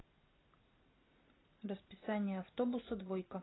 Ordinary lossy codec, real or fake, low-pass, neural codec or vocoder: AAC, 16 kbps; real; 7.2 kHz; none